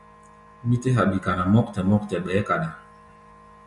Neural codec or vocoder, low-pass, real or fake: none; 10.8 kHz; real